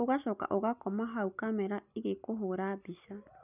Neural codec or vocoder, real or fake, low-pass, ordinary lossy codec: none; real; 3.6 kHz; none